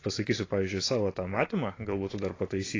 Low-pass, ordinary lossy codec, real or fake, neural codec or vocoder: 7.2 kHz; AAC, 32 kbps; real; none